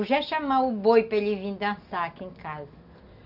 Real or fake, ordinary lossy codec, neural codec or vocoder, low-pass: real; none; none; 5.4 kHz